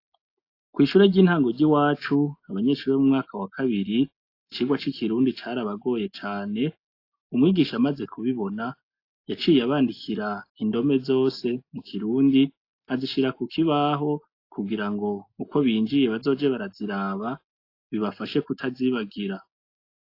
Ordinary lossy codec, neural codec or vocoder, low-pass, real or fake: AAC, 32 kbps; none; 5.4 kHz; real